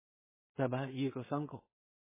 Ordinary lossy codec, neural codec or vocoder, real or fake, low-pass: MP3, 16 kbps; codec, 16 kHz in and 24 kHz out, 0.4 kbps, LongCat-Audio-Codec, two codebook decoder; fake; 3.6 kHz